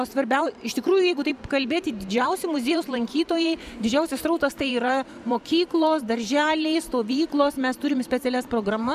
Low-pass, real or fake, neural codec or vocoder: 14.4 kHz; fake; vocoder, 44.1 kHz, 128 mel bands every 512 samples, BigVGAN v2